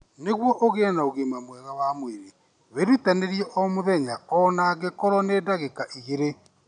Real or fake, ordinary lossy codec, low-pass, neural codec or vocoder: real; none; 9.9 kHz; none